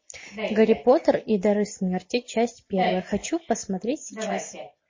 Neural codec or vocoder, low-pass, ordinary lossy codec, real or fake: none; 7.2 kHz; MP3, 32 kbps; real